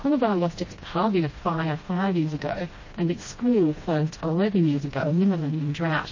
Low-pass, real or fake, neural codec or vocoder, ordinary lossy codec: 7.2 kHz; fake; codec, 16 kHz, 1 kbps, FreqCodec, smaller model; MP3, 32 kbps